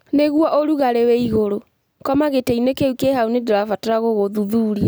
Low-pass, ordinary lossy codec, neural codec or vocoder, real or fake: none; none; none; real